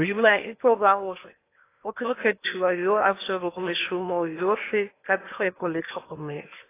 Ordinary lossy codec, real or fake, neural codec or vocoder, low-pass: AAC, 24 kbps; fake; codec, 16 kHz in and 24 kHz out, 0.8 kbps, FocalCodec, streaming, 65536 codes; 3.6 kHz